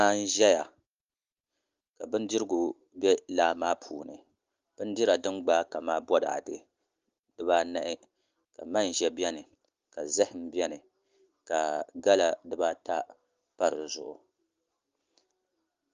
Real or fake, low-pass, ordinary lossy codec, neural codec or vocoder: real; 7.2 kHz; Opus, 32 kbps; none